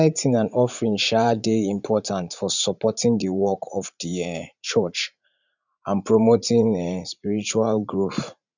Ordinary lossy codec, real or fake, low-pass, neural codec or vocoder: none; fake; 7.2 kHz; vocoder, 44.1 kHz, 80 mel bands, Vocos